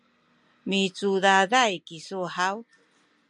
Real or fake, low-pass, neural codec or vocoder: real; 10.8 kHz; none